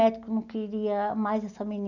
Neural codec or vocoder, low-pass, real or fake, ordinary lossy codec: none; 7.2 kHz; real; none